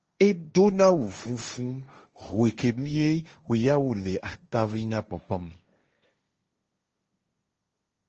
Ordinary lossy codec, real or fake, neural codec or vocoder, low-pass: Opus, 24 kbps; fake; codec, 16 kHz, 1.1 kbps, Voila-Tokenizer; 7.2 kHz